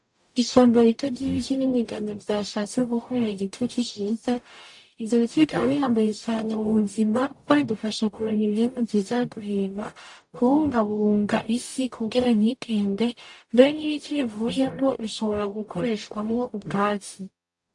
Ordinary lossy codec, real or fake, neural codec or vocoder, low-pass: AAC, 64 kbps; fake; codec, 44.1 kHz, 0.9 kbps, DAC; 10.8 kHz